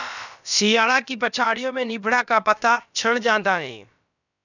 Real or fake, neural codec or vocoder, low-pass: fake; codec, 16 kHz, about 1 kbps, DyCAST, with the encoder's durations; 7.2 kHz